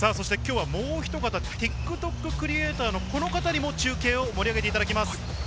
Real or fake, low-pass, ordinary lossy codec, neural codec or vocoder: real; none; none; none